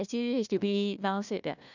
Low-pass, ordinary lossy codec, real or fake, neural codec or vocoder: 7.2 kHz; none; fake; codec, 16 kHz, 1 kbps, FunCodec, trained on Chinese and English, 50 frames a second